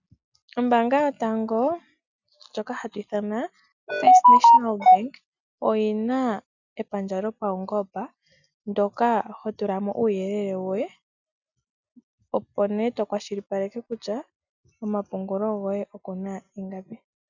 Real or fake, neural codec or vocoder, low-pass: real; none; 7.2 kHz